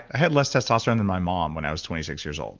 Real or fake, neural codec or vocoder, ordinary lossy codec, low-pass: real; none; Opus, 32 kbps; 7.2 kHz